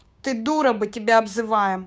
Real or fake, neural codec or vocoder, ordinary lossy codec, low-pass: fake; codec, 16 kHz, 6 kbps, DAC; none; none